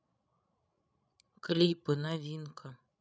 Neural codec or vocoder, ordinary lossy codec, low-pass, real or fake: codec, 16 kHz, 16 kbps, FreqCodec, larger model; none; none; fake